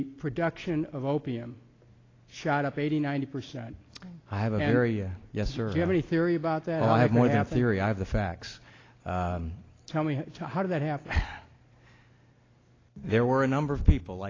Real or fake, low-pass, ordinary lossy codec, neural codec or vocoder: real; 7.2 kHz; AAC, 32 kbps; none